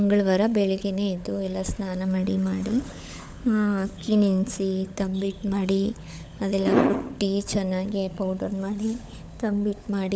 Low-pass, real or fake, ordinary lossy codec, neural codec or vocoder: none; fake; none; codec, 16 kHz, 16 kbps, FunCodec, trained on LibriTTS, 50 frames a second